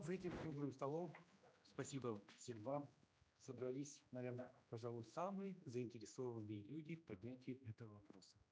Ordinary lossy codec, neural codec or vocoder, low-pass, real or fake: none; codec, 16 kHz, 1 kbps, X-Codec, HuBERT features, trained on general audio; none; fake